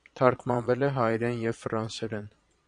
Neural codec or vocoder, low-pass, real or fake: vocoder, 22.05 kHz, 80 mel bands, Vocos; 9.9 kHz; fake